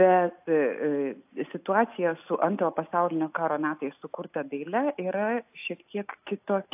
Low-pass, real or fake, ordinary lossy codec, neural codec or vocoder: 3.6 kHz; real; AAC, 32 kbps; none